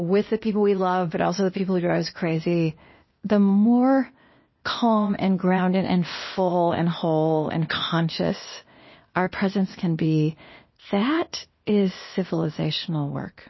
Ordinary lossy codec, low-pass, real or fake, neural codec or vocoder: MP3, 24 kbps; 7.2 kHz; fake; codec, 16 kHz, 0.8 kbps, ZipCodec